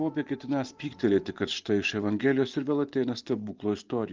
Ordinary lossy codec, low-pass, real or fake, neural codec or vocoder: Opus, 32 kbps; 7.2 kHz; fake; vocoder, 24 kHz, 100 mel bands, Vocos